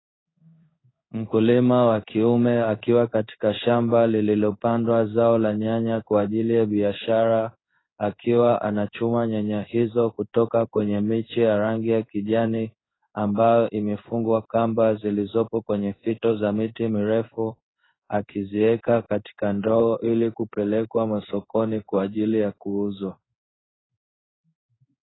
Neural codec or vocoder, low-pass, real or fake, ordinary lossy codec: codec, 16 kHz in and 24 kHz out, 1 kbps, XY-Tokenizer; 7.2 kHz; fake; AAC, 16 kbps